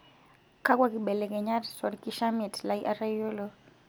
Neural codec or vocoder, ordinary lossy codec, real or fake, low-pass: none; none; real; none